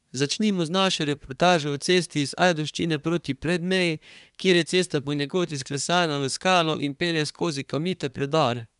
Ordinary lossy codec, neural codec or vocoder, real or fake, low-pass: none; codec, 24 kHz, 1 kbps, SNAC; fake; 10.8 kHz